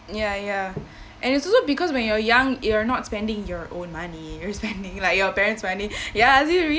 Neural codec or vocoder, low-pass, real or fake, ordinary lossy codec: none; none; real; none